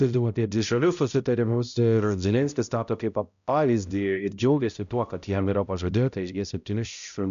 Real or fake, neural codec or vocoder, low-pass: fake; codec, 16 kHz, 0.5 kbps, X-Codec, HuBERT features, trained on balanced general audio; 7.2 kHz